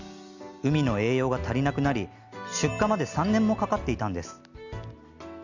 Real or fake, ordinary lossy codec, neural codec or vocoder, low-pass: real; none; none; 7.2 kHz